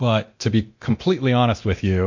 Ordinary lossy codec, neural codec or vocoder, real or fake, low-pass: MP3, 48 kbps; codec, 24 kHz, 0.9 kbps, DualCodec; fake; 7.2 kHz